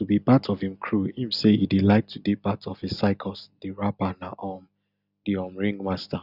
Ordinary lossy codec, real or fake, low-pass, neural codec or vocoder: none; real; 5.4 kHz; none